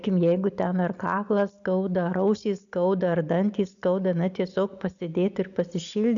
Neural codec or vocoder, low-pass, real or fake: none; 7.2 kHz; real